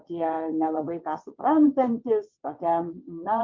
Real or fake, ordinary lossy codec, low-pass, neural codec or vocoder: fake; MP3, 64 kbps; 7.2 kHz; vocoder, 22.05 kHz, 80 mel bands, WaveNeXt